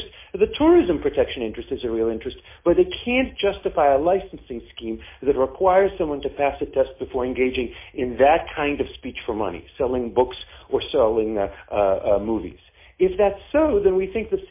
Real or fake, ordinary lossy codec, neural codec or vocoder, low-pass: real; MP3, 24 kbps; none; 3.6 kHz